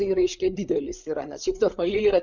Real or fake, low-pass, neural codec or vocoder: fake; 7.2 kHz; codec, 16 kHz, 8 kbps, FreqCodec, larger model